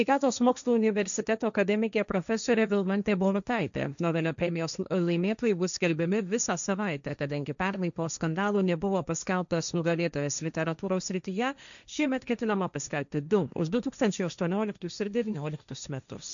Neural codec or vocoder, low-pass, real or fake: codec, 16 kHz, 1.1 kbps, Voila-Tokenizer; 7.2 kHz; fake